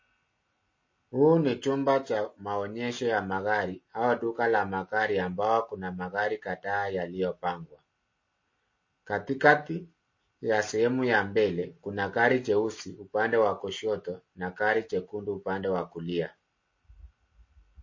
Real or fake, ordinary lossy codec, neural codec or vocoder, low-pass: real; MP3, 32 kbps; none; 7.2 kHz